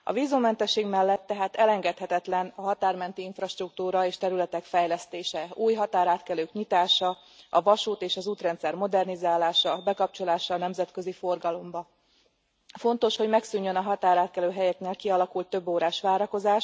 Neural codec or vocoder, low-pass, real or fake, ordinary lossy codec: none; none; real; none